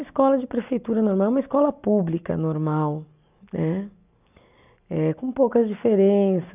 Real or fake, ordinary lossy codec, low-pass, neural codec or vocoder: real; none; 3.6 kHz; none